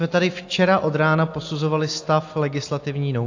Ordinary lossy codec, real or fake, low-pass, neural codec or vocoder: AAC, 48 kbps; real; 7.2 kHz; none